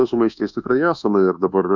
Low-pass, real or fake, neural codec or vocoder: 7.2 kHz; fake; codec, 24 kHz, 1.2 kbps, DualCodec